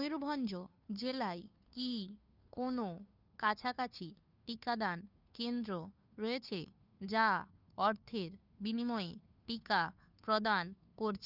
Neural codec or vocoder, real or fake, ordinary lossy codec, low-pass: codec, 16 kHz, 2 kbps, FunCodec, trained on Chinese and English, 25 frames a second; fake; none; 5.4 kHz